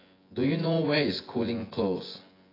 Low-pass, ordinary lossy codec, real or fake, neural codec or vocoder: 5.4 kHz; AAC, 24 kbps; fake; vocoder, 24 kHz, 100 mel bands, Vocos